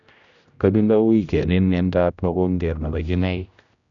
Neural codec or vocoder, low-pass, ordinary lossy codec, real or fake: codec, 16 kHz, 0.5 kbps, X-Codec, HuBERT features, trained on general audio; 7.2 kHz; none; fake